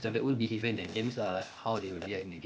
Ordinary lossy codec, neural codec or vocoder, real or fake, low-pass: none; codec, 16 kHz, 0.8 kbps, ZipCodec; fake; none